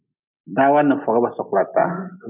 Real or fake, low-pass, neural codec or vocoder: real; 3.6 kHz; none